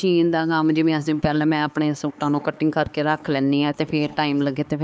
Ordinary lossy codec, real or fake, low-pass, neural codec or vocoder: none; fake; none; codec, 16 kHz, 4 kbps, X-Codec, HuBERT features, trained on LibriSpeech